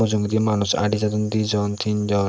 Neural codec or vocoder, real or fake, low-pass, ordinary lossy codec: none; real; none; none